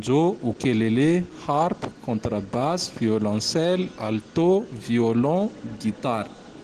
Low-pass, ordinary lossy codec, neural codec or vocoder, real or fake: 14.4 kHz; Opus, 16 kbps; none; real